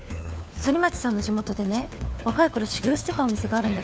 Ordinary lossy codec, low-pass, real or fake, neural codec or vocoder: none; none; fake; codec, 16 kHz, 4 kbps, FunCodec, trained on LibriTTS, 50 frames a second